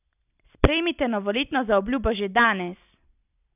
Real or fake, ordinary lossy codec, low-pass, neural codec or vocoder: real; none; 3.6 kHz; none